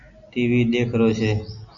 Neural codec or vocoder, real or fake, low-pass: none; real; 7.2 kHz